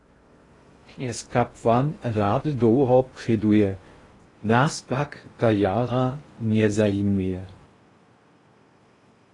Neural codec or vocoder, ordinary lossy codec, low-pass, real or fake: codec, 16 kHz in and 24 kHz out, 0.6 kbps, FocalCodec, streaming, 2048 codes; AAC, 32 kbps; 10.8 kHz; fake